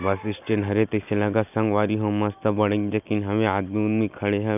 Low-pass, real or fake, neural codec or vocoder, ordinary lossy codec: 3.6 kHz; real; none; none